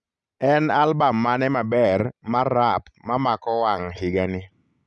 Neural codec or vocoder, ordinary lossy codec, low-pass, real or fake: none; none; 10.8 kHz; real